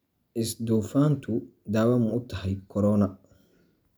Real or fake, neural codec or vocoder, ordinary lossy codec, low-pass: real; none; none; none